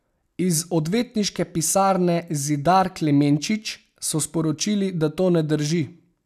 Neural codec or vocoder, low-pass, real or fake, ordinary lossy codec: none; 14.4 kHz; real; none